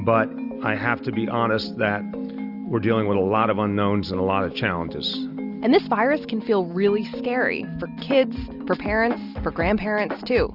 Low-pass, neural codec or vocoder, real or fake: 5.4 kHz; none; real